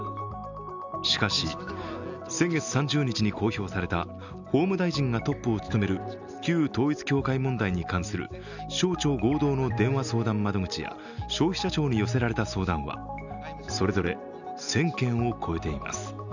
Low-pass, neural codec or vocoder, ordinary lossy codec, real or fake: 7.2 kHz; none; none; real